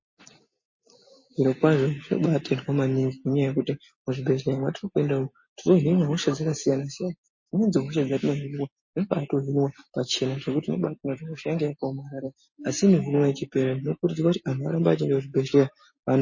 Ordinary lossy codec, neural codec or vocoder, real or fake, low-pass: MP3, 32 kbps; none; real; 7.2 kHz